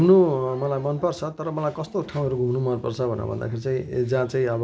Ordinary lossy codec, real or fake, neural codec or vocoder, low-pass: none; real; none; none